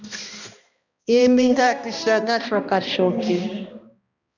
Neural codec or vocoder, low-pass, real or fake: codec, 16 kHz, 1 kbps, X-Codec, HuBERT features, trained on balanced general audio; 7.2 kHz; fake